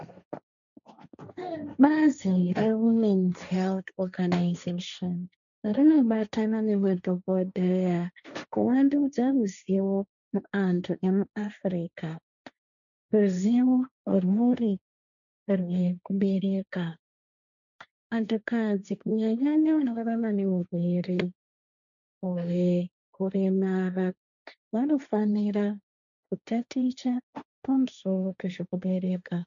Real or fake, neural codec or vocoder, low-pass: fake; codec, 16 kHz, 1.1 kbps, Voila-Tokenizer; 7.2 kHz